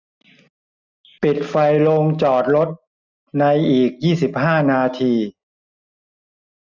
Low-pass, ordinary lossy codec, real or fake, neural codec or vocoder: 7.2 kHz; none; real; none